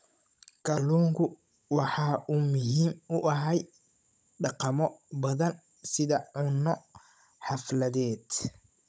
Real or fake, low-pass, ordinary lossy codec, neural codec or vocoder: fake; none; none; codec, 16 kHz, 16 kbps, FunCodec, trained on Chinese and English, 50 frames a second